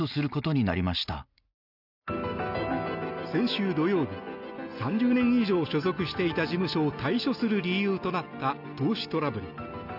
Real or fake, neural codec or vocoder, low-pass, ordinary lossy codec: real; none; 5.4 kHz; none